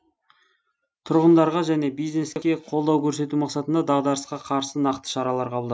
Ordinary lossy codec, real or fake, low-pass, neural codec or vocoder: none; real; none; none